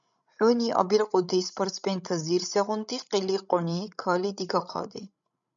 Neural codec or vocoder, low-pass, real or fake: codec, 16 kHz, 16 kbps, FreqCodec, larger model; 7.2 kHz; fake